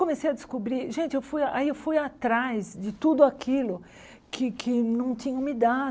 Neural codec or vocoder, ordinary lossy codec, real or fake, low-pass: none; none; real; none